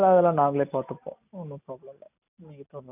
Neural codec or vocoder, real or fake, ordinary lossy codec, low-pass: none; real; none; 3.6 kHz